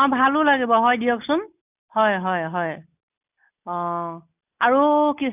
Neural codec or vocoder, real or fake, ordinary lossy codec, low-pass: none; real; none; 3.6 kHz